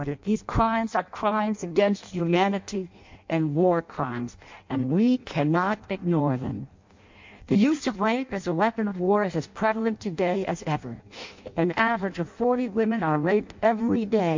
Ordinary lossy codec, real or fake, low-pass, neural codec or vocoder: MP3, 48 kbps; fake; 7.2 kHz; codec, 16 kHz in and 24 kHz out, 0.6 kbps, FireRedTTS-2 codec